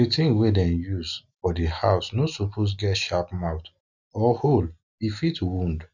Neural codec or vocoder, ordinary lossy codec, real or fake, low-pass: none; none; real; 7.2 kHz